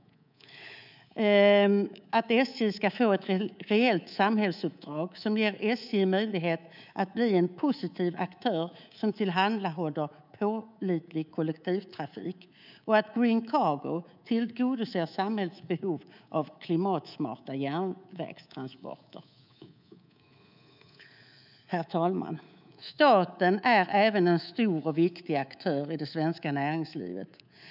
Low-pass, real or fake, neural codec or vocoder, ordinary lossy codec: 5.4 kHz; fake; codec, 24 kHz, 3.1 kbps, DualCodec; none